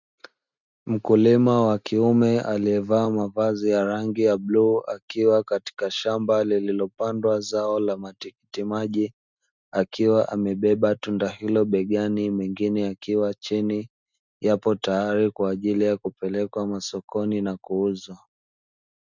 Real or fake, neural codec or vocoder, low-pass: real; none; 7.2 kHz